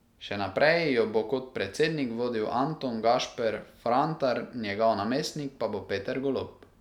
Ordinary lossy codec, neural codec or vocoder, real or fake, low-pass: none; none; real; 19.8 kHz